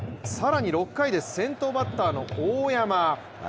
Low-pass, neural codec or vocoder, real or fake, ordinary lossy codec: none; none; real; none